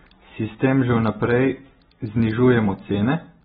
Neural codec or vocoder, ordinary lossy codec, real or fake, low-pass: none; AAC, 16 kbps; real; 10.8 kHz